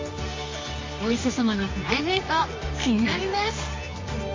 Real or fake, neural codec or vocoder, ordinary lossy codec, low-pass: fake; codec, 24 kHz, 0.9 kbps, WavTokenizer, medium music audio release; MP3, 32 kbps; 7.2 kHz